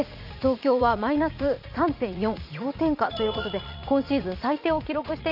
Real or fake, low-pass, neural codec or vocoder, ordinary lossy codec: fake; 5.4 kHz; vocoder, 44.1 kHz, 80 mel bands, Vocos; none